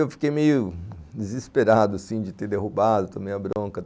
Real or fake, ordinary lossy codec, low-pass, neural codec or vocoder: real; none; none; none